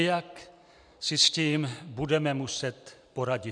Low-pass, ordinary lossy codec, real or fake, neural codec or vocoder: 9.9 kHz; MP3, 96 kbps; real; none